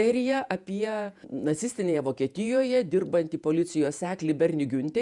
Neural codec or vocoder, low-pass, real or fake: vocoder, 48 kHz, 128 mel bands, Vocos; 10.8 kHz; fake